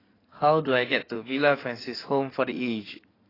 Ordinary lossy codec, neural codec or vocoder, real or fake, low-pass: AAC, 24 kbps; codec, 16 kHz in and 24 kHz out, 2.2 kbps, FireRedTTS-2 codec; fake; 5.4 kHz